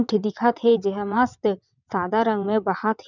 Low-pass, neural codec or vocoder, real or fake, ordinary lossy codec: 7.2 kHz; vocoder, 22.05 kHz, 80 mel bands, WaveNeXt; fake; none